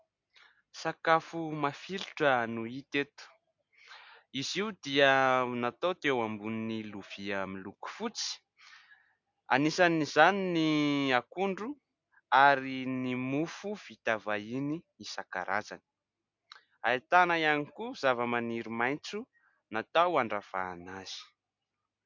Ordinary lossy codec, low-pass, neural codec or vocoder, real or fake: MP3, 64 kbps; 7.2 kHz; none; real